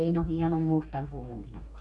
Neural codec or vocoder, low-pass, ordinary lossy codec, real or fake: codec, 44.1 kHz, 2.6 kbps, DAC; 10.8 kHz; Opus, 64 kbps; fake